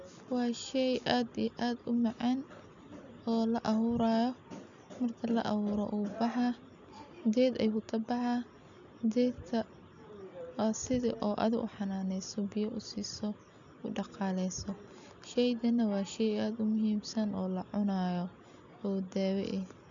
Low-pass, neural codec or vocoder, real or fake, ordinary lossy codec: 7.2 kHz; none; real; none